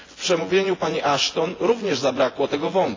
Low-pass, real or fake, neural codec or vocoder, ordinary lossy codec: 7.2 kHz; fake; vocoder, 24 kHz, 100 mel bands, Vocos; AAC, 32 kbps